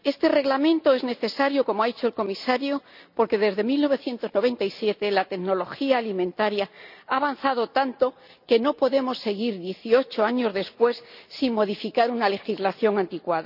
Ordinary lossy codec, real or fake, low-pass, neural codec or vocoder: none; real; 5.4 kHz; none